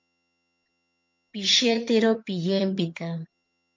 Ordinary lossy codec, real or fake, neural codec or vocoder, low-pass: MP3, 48 kbps; fake; vocoder, 22.05 kHz, 80 mel bands, HiFi-GAN; 7.2 kHz